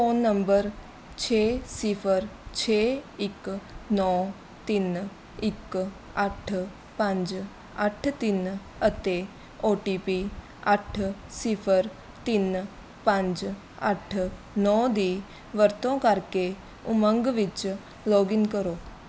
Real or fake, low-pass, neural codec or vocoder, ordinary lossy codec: real; none; none; none